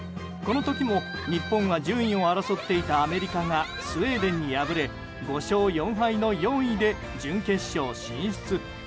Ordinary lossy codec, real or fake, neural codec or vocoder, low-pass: none; real; none; none